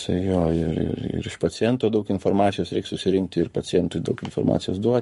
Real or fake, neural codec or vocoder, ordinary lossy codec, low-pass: fake; codec, 44.1 kHz, 7.8 kbps, Pupu-Codec; MP3, 48 kbps; 14.4 kHz